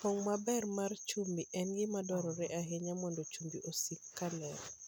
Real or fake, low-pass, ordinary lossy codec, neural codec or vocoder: real; none; none; none